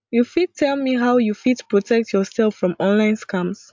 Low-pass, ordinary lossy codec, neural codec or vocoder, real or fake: 7.2 kHz; MP3, 64 kbps; none; real